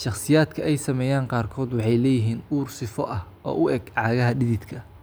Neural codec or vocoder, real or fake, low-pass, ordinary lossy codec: none; real; none; none